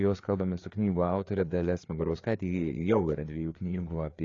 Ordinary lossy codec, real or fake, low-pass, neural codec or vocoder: AAC, 32 kbps; fake; 7.2 kHz; codec, 16 kHz, 4 kbps, FreqCodec, larger model